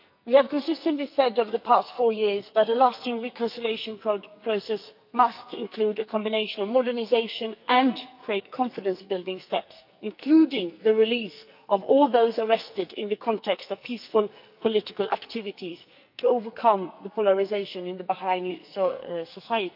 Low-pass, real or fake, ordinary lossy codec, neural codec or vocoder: 5.4 kHz; fake; none; codec, 44.1 kHz, 2.6 kbps, SNAC